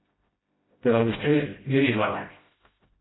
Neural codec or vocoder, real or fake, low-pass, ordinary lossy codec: codec, 16 kHz, 0.5 kbps, FreqCodec, smaller model; fake; 7.2 kHz; AAC, 16 kbps